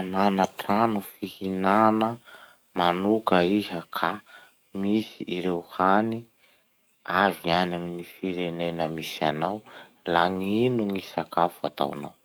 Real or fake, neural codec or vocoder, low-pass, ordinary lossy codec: fake; codec, 44.1 kHz, 7.8 kbps, DAC; none; none